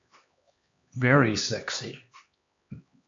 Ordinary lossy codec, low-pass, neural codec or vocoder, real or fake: AAC, 64 kbps; 7.2 kHz; codec, 16 kHz, 2 kbps, X-Codec, HuBERT features, trained on LibriSpeech; fake